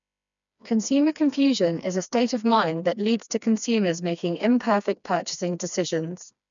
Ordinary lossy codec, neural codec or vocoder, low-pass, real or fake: none; codec, 16 kHz, 2 kbps, FreqCodec, smaller model; 7.2 kHz; fake